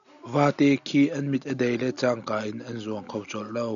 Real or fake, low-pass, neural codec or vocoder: real; 7.2 kHz; none